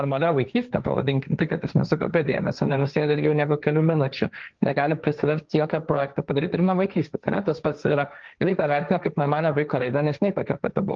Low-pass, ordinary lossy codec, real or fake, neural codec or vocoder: 7.2 kHz; Opus, 24 kbps; fake; codec, 16 kHz, 1.1 kbps, Voila-Tokenizer